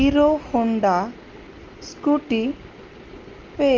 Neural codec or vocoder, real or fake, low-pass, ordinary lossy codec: none; real; 7.2 kHz; Opus, 32 kbps